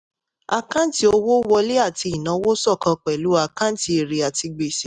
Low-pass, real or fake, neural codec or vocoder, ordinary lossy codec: 10.8 kHz; real; none; none